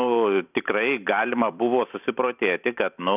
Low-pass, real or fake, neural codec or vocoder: 3.6 kHz; fake; vocoder, 44.1 kHz, 128 mel bands every 256 samples, BigVGAN v2